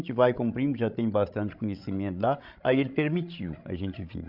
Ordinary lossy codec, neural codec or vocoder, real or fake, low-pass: Opus, 64 kbps; codec, 16 kHz, 16 kbps, FreqCodec, larger model; fake; 5.4 kHz